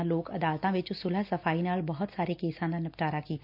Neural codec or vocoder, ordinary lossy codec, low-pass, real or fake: none; MP3, 48 kbps; 5.4 kHz; real